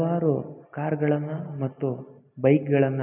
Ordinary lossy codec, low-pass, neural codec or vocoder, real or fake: none; 3.6 kHz; none; real